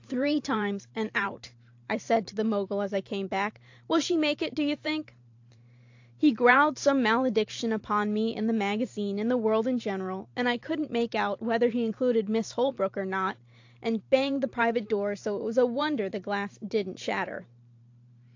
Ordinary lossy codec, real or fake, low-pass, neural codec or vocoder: AAC, 48 kbps; fake; 7.2 kHz; vocoder, 44.1 kHz, 128 mel bands every 512 samples, BigVGAN v2